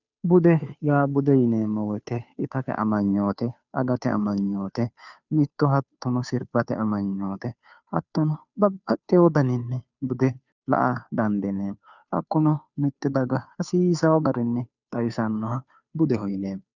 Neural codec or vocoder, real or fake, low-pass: codec, 16 kHz, 2 kbps, FunCodec, trained on Chinese and English, 25 frames a second; fake; 7.2 kHz